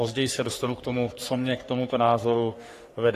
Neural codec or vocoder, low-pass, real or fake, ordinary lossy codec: codec, 44.1 kHz, 3.4 kbps, Pupu-Codec; 14.4 kHz; fake; AAC, 48 kbps